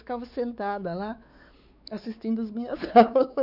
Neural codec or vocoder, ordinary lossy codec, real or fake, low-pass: codec, 16 kHz, 4 kbps, X-Codec, WavLM features, trained on Multilingual LibriSpeech; none; fake; 5.4 kHz